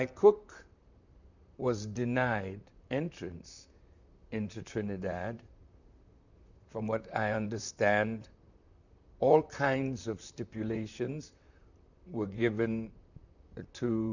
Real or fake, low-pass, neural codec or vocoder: fake; 7.2 kHz; vocoder, 44.1 kHz, 128 mel bands, Pupu-Vocoder